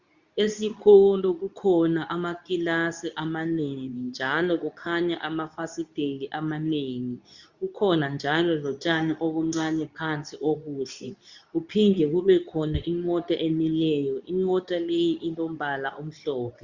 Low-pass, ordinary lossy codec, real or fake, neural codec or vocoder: 7.2 kHz; Opus, 64 kbps; fake; codec, 24 kHz, 0.9 kbps, WavTokenizer, medium speech release version 2